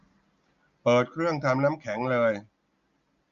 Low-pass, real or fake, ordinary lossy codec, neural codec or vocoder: 7.2 kHz; real; Opus, 64 kbps; none